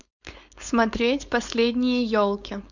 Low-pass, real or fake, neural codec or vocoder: 7.2 kHz; fake; codec, 16 kHz, 4.8 kbps, FACodec